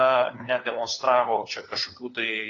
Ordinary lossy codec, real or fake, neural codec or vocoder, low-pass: AAC, 32 kbps; fake; codec, 16 kHz, 4 kbps, FunCodec, trained on LibriTTS, 50 frames a second; 7.2 kHz